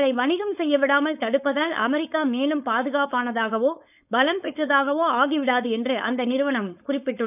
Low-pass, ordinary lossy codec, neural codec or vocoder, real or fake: 3.6 kHz; none; codec, 16 kHz, 4.8 kbps, FACodec; fake